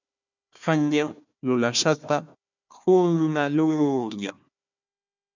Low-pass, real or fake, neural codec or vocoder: 7.2 kHz; fake; codec, 16 kHz, 1 kbps, FunCodec, trained on Chinese and English, 50 frames a second